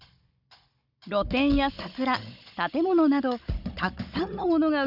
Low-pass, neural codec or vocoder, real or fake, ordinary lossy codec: 5.4 kHz; codec, 16 kHz, 16 kbps, FunCodec, trained on Chinese and English, 50 frames a second; fake; none